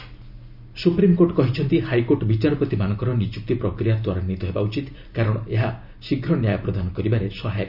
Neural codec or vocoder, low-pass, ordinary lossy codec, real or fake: none; 5.4 kHz; none; real